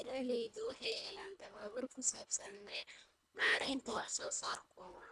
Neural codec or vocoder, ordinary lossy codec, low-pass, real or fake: codec, 24 kHz, 1.5 kbps, HILCodec; none; none; fake